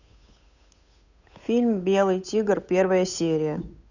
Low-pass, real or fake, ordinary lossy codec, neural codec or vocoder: 7.2 kHz; fake; none; codec, 16 kHz, 8 kbps, FunCodec, trained on Chinese and English, 25 frames a second